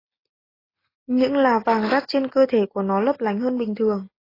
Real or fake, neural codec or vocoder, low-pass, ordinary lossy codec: real; none; 5.4 kHz; AAC, 24 kbps